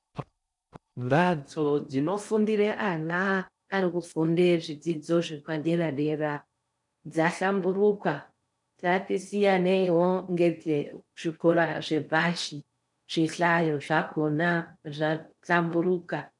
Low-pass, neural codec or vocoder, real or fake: 10.8 kHz; codec, 16 kHz in and 24 kHz out, 0.6 kbps, FocalCodec, streaming, 4096 codes; fake